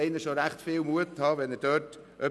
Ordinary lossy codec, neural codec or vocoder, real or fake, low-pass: none; none; real; none